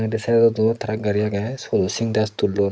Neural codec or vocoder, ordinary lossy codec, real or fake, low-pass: none; none; real; none